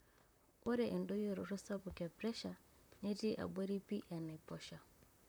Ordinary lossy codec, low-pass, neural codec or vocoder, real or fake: none; none; vocoder, 44.1 kHz, 128 mel bands, Pupu-Vocoder; fake